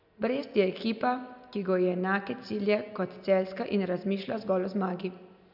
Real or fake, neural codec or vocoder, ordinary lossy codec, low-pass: real; none; none; 5.4 kHz